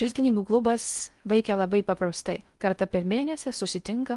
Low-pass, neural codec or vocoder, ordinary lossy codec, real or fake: 10.8 kHz; codec, 16 kHz in and 24 kHz out, 0.6 kbps, FocalCodec, streaming, 4096 codes; Opus, 32 kbps; fake